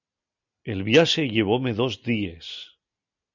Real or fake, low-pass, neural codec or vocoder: real; 7.2 kHz; none